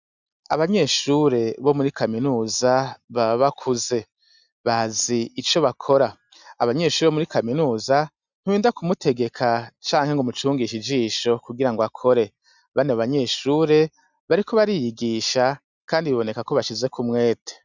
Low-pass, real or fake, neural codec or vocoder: 7.2 kHz; real; none